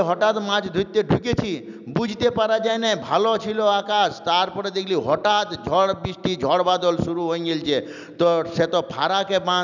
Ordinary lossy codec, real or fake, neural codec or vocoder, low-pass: none; real; none; 7.2 kHz